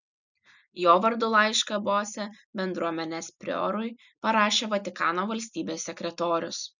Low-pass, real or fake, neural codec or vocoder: 7.2 kHz; real; none